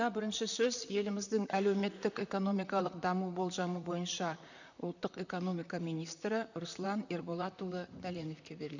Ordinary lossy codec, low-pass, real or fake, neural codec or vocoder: none; 7.2 kHz; fake; vocoder, 44.1 kHz, 128 mel bands, Pupu-Vocoder